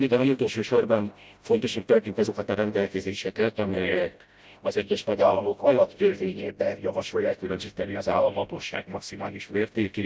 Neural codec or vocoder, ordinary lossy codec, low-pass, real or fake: codec, 16 kHz, 0.5 kbps, FreqCodec, smaller model; none; none; fake